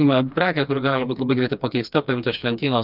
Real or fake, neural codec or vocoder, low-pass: fake; codec, 16 kHz, 2 kbps, FreqCodec, smaller model; 5.4 kHz